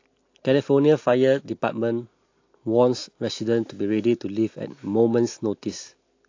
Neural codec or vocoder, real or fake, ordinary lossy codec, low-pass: none; real; AAC, 48 kbps; 7.2 kHz